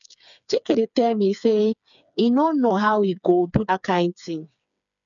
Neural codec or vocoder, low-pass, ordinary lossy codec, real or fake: codec, 16 kHz, 4 kbps, FreqCodec, smaller model; 7.2 kHz; none; fake